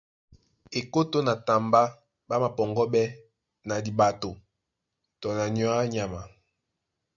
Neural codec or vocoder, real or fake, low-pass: none; real; 7.2 kHz